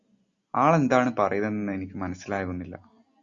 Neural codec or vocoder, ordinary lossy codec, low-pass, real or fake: none; Opus, 64 kbps; 7.2 kHz; real